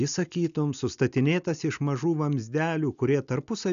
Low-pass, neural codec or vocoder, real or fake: 7.2 kHz; none; real